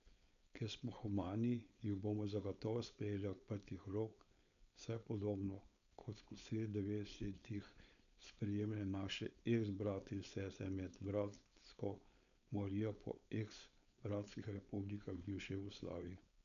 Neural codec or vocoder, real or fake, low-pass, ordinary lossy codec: codec, 16 kHz, 4.8 kbps, FACodec; fake; 7.2 kHz; none